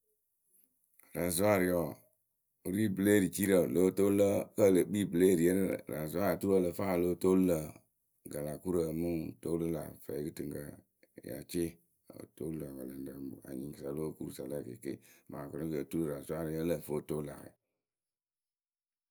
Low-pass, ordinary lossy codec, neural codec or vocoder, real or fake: none; none; none; real